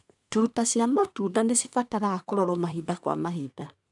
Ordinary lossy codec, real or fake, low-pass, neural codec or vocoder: none; fake; 10.8 kHz; codec, 24 kHz, 1 kbps, SNAC